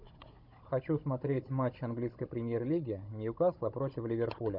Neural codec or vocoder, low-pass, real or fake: codec, 16 kHz, 16 kbps, FunCodec, trained on Chinese and English, 50 frames a second; 5.4 kHz; fake